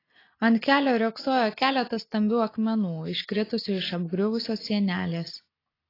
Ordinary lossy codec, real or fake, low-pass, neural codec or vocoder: AAC, 24 kbps; real; 5.4 kHz; none